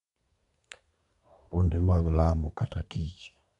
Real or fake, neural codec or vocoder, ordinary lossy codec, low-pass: fake; codec, 24 kHz, 1 kbps, SNAC; none; 10.8 kHz